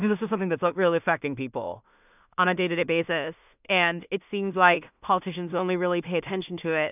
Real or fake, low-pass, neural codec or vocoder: fake; 3.6 kHz; codec, 16 kHz in and 24 kHz out, 0.4 kbps, LongCat-Audio-Codec, two codebook decoder